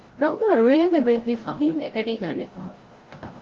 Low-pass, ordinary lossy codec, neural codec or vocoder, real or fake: 7.2 kHz; Opus, 16 kbps; codec, 16 kHz, 0.5 kbps, FreqCodec, larger model; fake